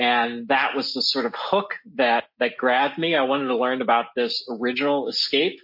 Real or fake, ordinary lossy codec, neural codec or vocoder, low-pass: real; MP3, 32 kbps; none; 5.4 kHz